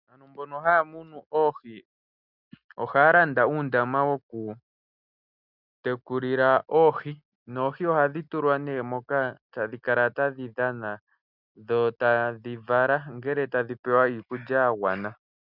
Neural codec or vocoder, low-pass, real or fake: none; 5.4 kHz; real